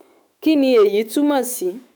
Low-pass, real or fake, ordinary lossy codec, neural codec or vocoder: none; fake; none; autoencoder, 48 kHz, 128 numbers a frame, DAC-VAE, trained on Japanese speech